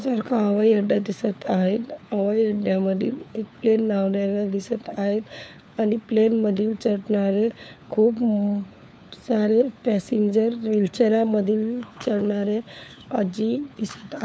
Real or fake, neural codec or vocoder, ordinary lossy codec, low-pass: fake; codec, 16 kHz, 4 kbps, FunCodec, trained on LibriTTS, 50 frames a second; none; none